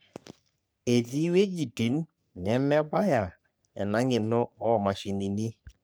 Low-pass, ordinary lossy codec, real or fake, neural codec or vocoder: none; none; fake; codec, 44.1 kHz, 3.4 kbps, Pupu-Codec